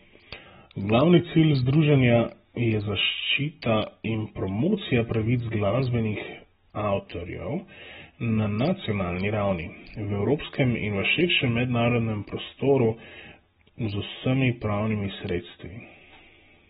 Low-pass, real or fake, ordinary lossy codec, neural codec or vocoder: 19.8 kHz; real; AAC, 16 kbps; none